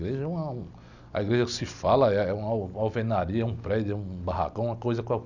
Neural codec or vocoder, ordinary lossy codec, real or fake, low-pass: none; none; real; 7.2 kHz